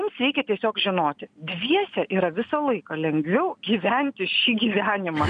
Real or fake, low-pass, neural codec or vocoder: real; 9.9 kHz; none